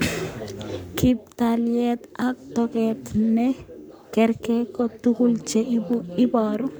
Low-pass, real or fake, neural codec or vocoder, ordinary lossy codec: none; fake; codec, 44.1 kHz, 7.8 kbps, Pupu-Codec; none